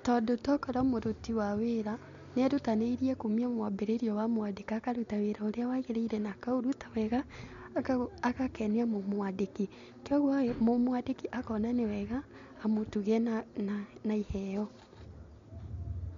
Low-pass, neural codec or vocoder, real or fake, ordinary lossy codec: 7.2 kHz; none; real; MP3, 48 kbps